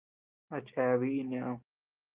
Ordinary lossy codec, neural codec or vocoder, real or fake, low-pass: Opus, 32 kbps; none; real; 3.6 kHz